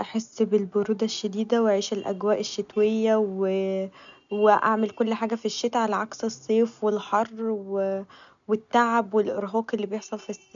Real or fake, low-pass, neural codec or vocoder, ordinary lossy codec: real; 7.2 kHz; none; none